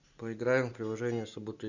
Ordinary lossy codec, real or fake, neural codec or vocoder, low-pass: Opus, 64 kbps; real; none; 7.2 kHz